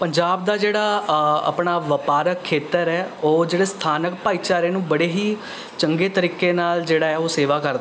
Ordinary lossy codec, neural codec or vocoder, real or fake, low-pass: none; none; real; none